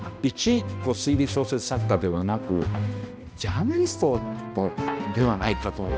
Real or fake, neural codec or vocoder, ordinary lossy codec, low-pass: fake; codec, 16 kHz, 1 kbps, X-Codec, HuBERT features, trained on balanced general audio; none; none